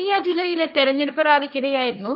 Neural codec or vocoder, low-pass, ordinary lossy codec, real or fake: codec, 16 kHz, 1.1 kbps, Voila-Tokenizer; 5.4 kHz; none; fake